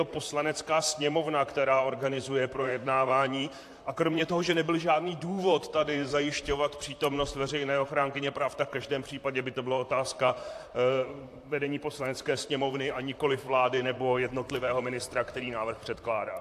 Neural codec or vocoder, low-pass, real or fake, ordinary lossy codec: vocoder, 44.1 kHz, 128 mel bands, Pupu-Vocoder; 14.4 kHz; fake; AAC, 64 kbps